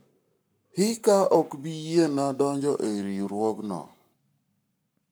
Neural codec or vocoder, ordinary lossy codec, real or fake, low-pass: codec, 44.1 kHz, 7.8 kbps, Pupu-Codec; none; fake; none